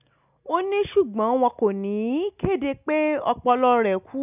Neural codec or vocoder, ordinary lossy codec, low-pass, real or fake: none; none; 3.6 kHz; real